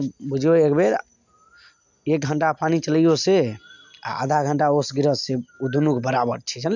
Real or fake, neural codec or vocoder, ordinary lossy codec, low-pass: real; none; none; 7.2 kHz